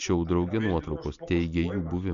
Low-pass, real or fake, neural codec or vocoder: 7.2 kHz; real; none